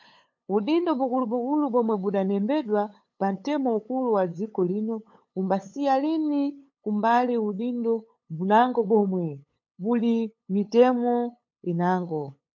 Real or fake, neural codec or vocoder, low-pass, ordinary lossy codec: fake; codec, 16 kHz, 8 kbps, FunCodec, trained on LibriTTS, 25 frames a second; 7.2 kHz; MP3, 48 kbps